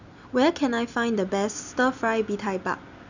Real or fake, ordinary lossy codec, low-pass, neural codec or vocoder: real; none; 7.2 kHz; none